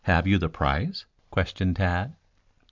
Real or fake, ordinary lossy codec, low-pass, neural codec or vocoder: real; MP3, 64 kbps; 7.2 kHz; none